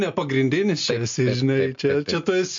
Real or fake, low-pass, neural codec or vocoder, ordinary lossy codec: real; 7.2 kHz; none; MP3, 48 kbps